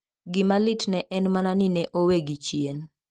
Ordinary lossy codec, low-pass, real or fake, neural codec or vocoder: Opus, 16 kbps; 10.8 kHz; real; none